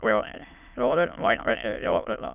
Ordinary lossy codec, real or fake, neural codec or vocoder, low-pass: none; fake; autoencoder, 22.05 kHz, a latent of 192 numbers a frame, VITS, trained on many speakers; 3.6 kHz